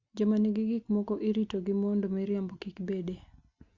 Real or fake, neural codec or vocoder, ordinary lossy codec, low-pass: real; none; Opus, 64 kbps; 7.2 kHz